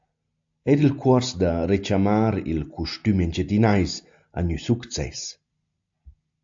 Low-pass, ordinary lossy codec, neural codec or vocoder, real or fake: 7.2 kHz; AAC, 64 kbps; none; real